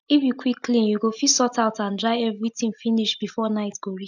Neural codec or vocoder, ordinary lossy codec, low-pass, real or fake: none; none; 7.2 kHz; real